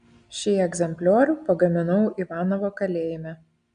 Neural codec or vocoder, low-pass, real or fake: none; 9.9 kHz; real